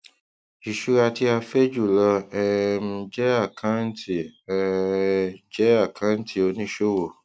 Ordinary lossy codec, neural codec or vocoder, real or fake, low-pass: none; none; real; none